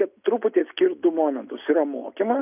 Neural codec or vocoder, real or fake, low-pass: none; real; 3.6 kHz